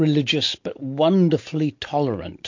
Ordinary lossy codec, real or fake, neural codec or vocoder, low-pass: MP3, 48 kbps; real; none; 7.2 kHz